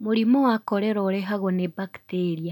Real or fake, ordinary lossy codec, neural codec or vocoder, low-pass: real; none; none; 19.8 kHz